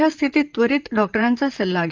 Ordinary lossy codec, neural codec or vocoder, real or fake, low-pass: Opus, 32 kbps; vocoder, 44.1 kHz, 128 mel bands, Pupu-Vocoder; fake; 7.2 kHz